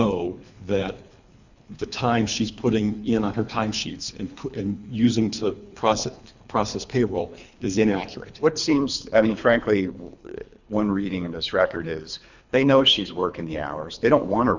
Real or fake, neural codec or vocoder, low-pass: fake; codec, 24 kHz, 3 kbps, HILCodec; 7.2 kHz